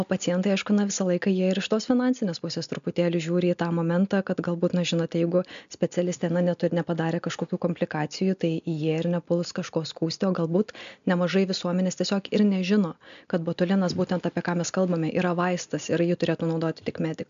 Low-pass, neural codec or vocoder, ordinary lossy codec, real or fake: 7.2 kHz; none; MP3, 64 kbps; real